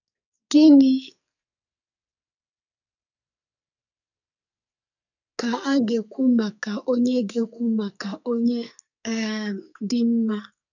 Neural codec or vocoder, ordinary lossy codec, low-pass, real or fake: codec, 44.1 kHz, 2.6 kbps, SNAC; none; 7.2 kHz; fake